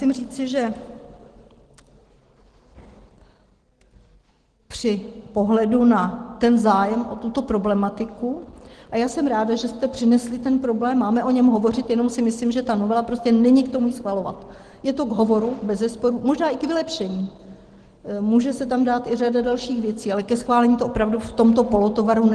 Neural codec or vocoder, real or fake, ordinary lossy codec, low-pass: none; real; Opus, 16 kbps; 9.9 kHz